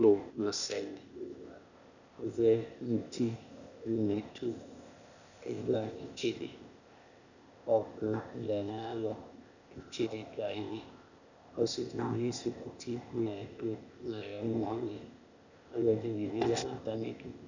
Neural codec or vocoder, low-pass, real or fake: codec, 16 kHz, 0.8 kbps, ZipCodec; 7.2 kHz; fake